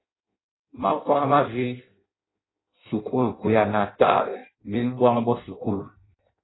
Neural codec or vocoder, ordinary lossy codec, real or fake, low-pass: codec, 16 kHz in and 24 kHz out, 0.6 kbps, FireRedTTS-2 codec; AAC, 16 kbps; fake; 7.2 kHz